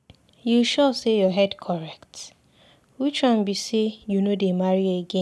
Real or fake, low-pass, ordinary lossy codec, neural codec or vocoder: real; none; none; none